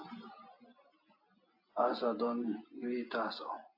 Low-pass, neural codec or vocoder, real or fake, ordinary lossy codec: 5.4 kHz; none; real; AAC, 32 kbps